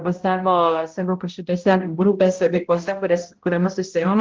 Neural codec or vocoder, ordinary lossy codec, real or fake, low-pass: codec, 16 kHz, 0.5 kbps, X-Codec, HuBERT features, trained on balanced general audio; Opus, 16 kbps; fake; 7.2 kHz